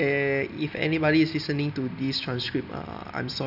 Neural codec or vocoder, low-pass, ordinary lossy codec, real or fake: none; 5.4 kHz; none; real